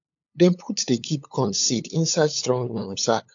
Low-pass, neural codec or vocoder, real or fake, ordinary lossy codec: 7.2 kHz; codec, 16 kHz, 8 kbps, FunCodec, trained on LibriTTS, 25 frames a second; fake; AAC, 64 kbps